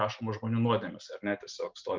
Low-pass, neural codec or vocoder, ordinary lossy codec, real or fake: 7.2 kHz; none; Opus, 24 kbps; real